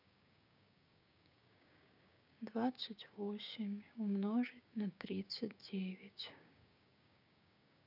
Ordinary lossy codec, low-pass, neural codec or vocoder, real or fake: none; 5.4 kHz; codec, 16 kHz, 6 kbps, DAC; fake